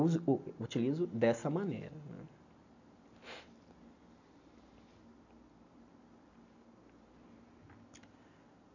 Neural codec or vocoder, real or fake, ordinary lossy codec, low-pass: none; real; none; 7.2 kHz